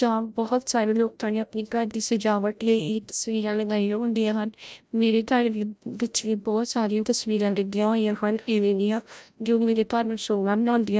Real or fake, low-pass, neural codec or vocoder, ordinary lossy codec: fake; none; codec, 16 kHz, 0.5 kbps, FreqCodec, larger model; none